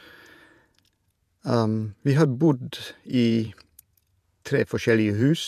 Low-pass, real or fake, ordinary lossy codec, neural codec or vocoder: 14.4 kHz; fake; none; vocoder, 48 kHz, 128 mel bands, Vocos